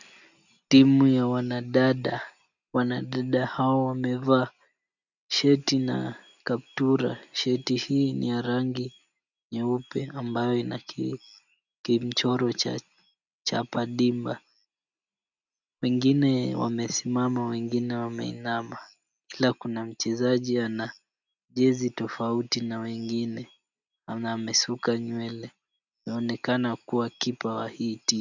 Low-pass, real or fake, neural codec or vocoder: 7.2 kHz; real; none